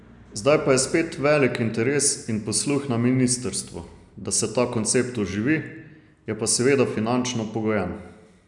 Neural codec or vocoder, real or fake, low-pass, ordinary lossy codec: none; real; 10.8 kHz; none